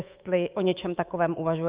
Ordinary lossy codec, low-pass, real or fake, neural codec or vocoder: Opus, 32 kbps; 3.6 kHz; fake; codec, 24 kHz, 3.1 kbps, DualCodec